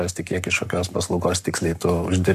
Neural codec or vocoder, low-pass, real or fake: codec, 44.1 kHz, 7.8 kbps, Pupu-Codec; 14.4 kHz; fake